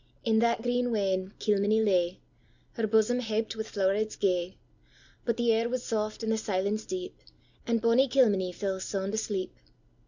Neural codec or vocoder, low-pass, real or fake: none; 7.2 kHz; real